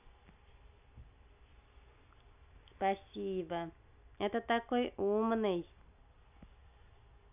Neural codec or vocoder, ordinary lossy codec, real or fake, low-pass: none; none; real; 3.6 kHz